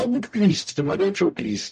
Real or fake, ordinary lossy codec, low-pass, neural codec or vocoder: fake; MP3, 48 kbps; 14.4 kHz; codec, 44.1 kHz, 0.9 kbps, DAC